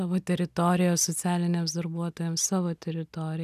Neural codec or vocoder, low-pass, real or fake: none; 14.4 kHz; real